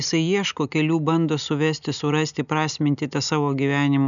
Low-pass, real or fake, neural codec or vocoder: 7.2 kHz; real; none